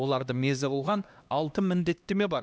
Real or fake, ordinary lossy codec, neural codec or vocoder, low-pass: fake; none; codec, 16 kHz, 1 kbps, X-Codec, HuBERT features, trained on LibriSpeech; none